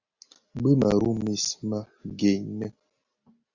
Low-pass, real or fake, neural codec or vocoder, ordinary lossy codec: 7.2 kHz; real; none; Opus, 64 kbps